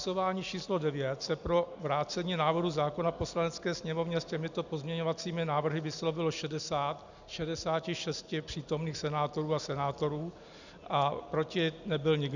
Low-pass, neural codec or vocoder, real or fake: 7.2 kHz; none; real